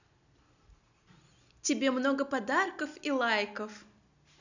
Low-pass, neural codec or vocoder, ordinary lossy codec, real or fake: 7.2 kHz; none; none; real